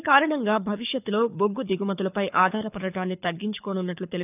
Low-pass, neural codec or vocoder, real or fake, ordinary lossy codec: 3.6 kHz; codec, 24 kHz, 6 kbps, HILCodec; fake; none